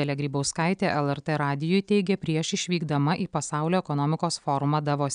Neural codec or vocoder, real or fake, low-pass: none; real; 9.9 kHz